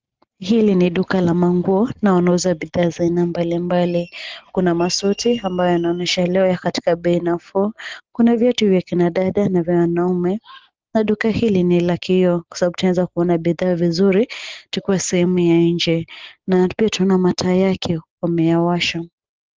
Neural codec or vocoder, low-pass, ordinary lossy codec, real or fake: none; 7.2 kHz; Opus, 16 kbps; real